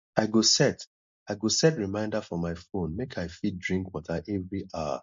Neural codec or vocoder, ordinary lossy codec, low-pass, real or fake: none; MP3, 48 kbps; 7.2 kHz; real